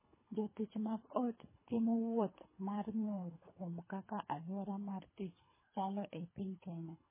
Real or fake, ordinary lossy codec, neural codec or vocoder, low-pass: fake; MP3, 16 kbps; codec, 24 kHz, 3 kbps, HILCodec; 3.6 kHz